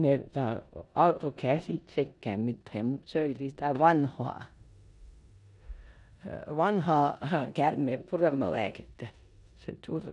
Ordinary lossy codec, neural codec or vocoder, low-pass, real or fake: none; codec, 16 kHz in and 24 kHz out, 0.9 kbps, LongCat-Audio-Codec, four codebook decoder; 10.8 kHz; fake